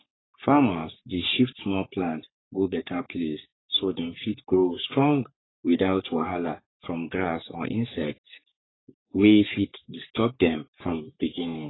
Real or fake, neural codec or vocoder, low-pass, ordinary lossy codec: fake; codec, 44.1 kHz, 3.4 kbps, Pupu-Codec; 7.2 kHz; AAC, 16 kbps